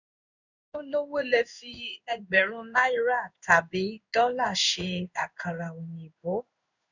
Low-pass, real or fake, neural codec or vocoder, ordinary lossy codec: 7.2 kHz; fake; codec, 16 kHz in and 24 kHz out, 1 kbps, XY-Tokenizer; none